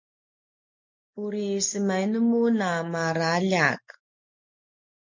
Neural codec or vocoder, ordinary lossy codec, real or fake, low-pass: none; MP3, 48 kbps; real; 7.2 kHz